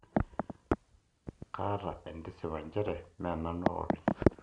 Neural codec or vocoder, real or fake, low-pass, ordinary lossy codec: none; real; 10.8 kHz; none